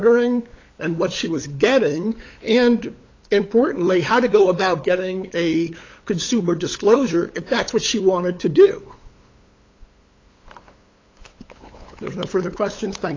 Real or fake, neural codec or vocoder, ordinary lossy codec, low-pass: fake; codec, 16 kHz, 8 kbps, FunCodec, trained on LibriTTS, 25 frames a second; AAC, 32 kbps; 7.2 kHz